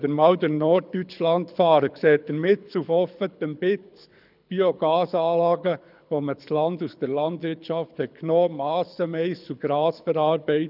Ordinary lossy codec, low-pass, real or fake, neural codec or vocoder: none; 5.4 kHz; fake; codec, 24 kHz, 6 kbps, HILCodec